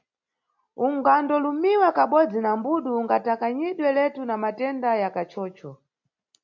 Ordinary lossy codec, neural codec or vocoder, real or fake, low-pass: MP3, 48 kbps; none; real; 7.2 kHz